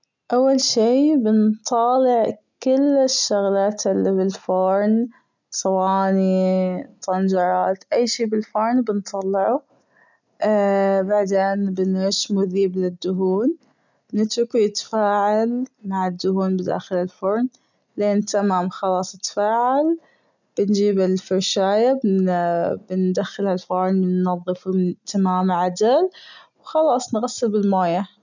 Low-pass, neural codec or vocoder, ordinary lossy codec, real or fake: 7.2 kHz; none; none; real